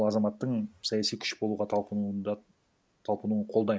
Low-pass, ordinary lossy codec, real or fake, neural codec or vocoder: none; none; real; none